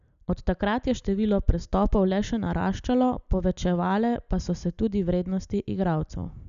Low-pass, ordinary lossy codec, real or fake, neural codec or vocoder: 7.2 kHz; MP3, 96 kbps; real; none